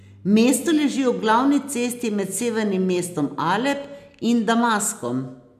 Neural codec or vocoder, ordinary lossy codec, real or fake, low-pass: none; none; real; 14.4 kHz